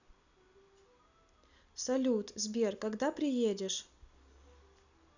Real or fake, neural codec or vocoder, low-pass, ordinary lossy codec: real; none; 7.2 kHz; none